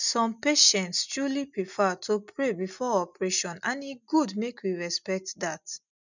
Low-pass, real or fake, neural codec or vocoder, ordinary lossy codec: 7.2 kHz; real; none; none